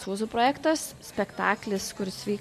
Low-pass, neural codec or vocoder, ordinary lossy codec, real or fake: 14.4 kHz; none; MP3, 64 kbps; real